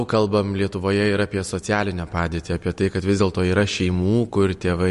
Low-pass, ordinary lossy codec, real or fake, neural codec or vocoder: 14.4 kHz; MP3, 48 kbps; real; none